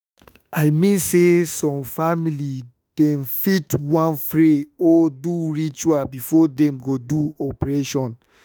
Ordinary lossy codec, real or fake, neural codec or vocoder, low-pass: none; fake; autoencoder, 48 kHz, 32 numbers a frame, DAC-VAE, trained on Japanese speech; none